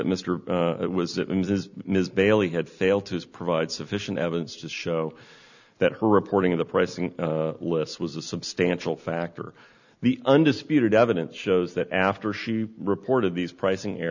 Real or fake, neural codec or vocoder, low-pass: real; none; 7.2 kHz